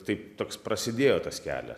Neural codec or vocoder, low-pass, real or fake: vocoder, 44.1 kHz, 128 mel bands every 256 samples, BigVGAN v2; 14.4 kHz; fake